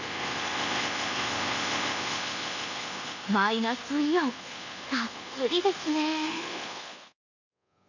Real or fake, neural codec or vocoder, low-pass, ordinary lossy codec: fake; codec, 24 kHz, 1.2 kbps, DualCodec; 7.2 kHz; none